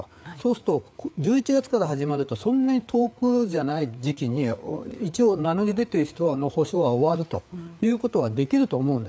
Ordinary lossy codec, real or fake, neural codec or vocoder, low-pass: none; fake; codec, 16 kHz, 4 kbps, FreqCodec, larger model; none